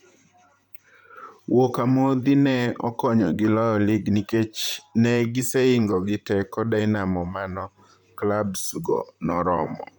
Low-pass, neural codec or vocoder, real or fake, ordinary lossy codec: 19.8 kHz; vocoder, 44.1 kHz, 128 mel bands, Pupu-Vocoder; fake; none